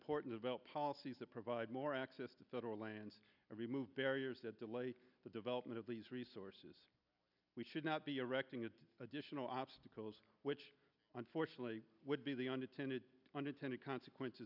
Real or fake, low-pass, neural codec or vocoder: real; 5.4 kHz; none